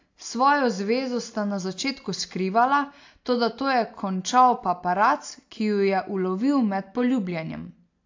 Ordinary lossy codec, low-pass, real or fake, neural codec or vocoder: AAC, 48 kbps; 7.2 kHz; real; none